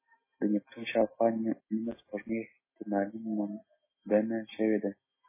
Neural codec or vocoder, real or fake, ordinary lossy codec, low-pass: none; real; MP3, 16 kbps; 3.6 kHz